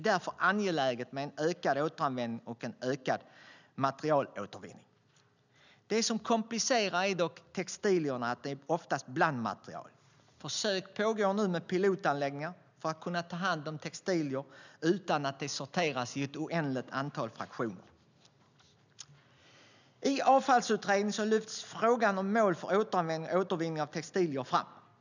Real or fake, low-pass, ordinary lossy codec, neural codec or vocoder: real; 7.2 kHz; none; none